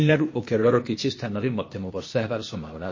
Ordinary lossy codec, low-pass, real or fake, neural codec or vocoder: MP3, 32 kbps; 7.2 kHz; fake; codec, 16 kHz, 0.8 kbps, ZipCodec